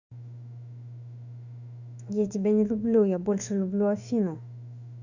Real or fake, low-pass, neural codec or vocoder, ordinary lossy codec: fake; 7.2 kHz; autoencoder, 48 kHz, 32 numbers a frame, DAC-VAE, trained on Japanese speech; none